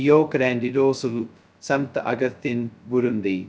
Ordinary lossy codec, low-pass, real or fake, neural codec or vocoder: none; none; fake; codec, 16 kHz, 0.2 kbps, FocalCodec